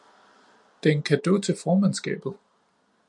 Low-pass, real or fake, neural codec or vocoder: 10.8 kHz; real; none